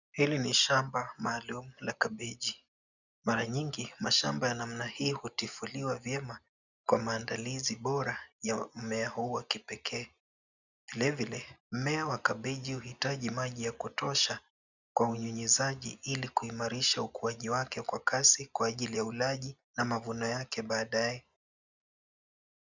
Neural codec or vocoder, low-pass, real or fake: none; 7.2 kHz; real